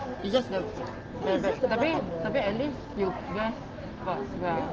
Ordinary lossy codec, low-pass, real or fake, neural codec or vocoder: Opus, 16 kbps; 7.2 kHz; real; none